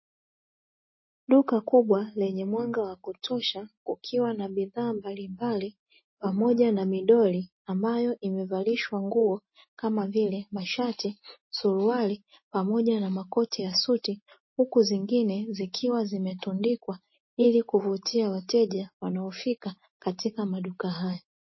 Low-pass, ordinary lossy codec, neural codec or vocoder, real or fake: 7.2 kHz; MP3, 24 kbps; none; real